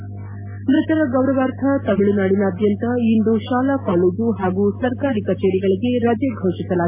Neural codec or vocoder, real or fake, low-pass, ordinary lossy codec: none; real; 3.6 kHz; AAC, 32 kbps